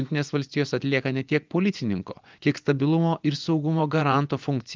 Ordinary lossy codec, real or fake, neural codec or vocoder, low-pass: Opus, 24 kbps; fake; vocoder, 24 kHz, 100 mel bands, Vocos; 7.2 kHz